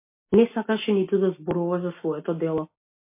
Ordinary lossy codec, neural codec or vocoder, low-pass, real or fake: MP3, 24 kbps; none; 3.6 kHz; real